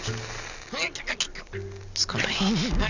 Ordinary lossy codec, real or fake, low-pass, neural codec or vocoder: none; real; 7.2 kHz; none